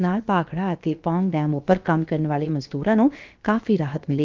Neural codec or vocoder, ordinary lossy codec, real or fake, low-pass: codec, 16 kHz, about 1 kbps, DyCAST, with the encoder's durations; Opus, 32 kbps; fake; 7.2 kHz